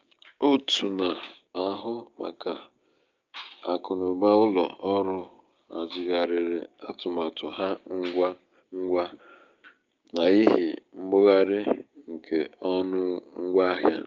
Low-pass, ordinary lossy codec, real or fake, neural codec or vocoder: 7.2 kHz; Opus, 24 kbps; fake; codec, 16 kHz, 6 kbps, DAC